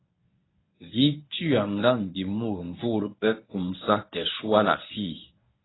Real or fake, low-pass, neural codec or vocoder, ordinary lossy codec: fake; 7.2 kHz; codec, 24 kHz, 0.9 kbps, WavTokenizer, medium speech release version 1; AAC, 16 kbps